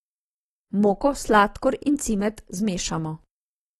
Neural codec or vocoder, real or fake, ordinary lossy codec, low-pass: none; real; AAC, 32 kbps; 19.8 kHz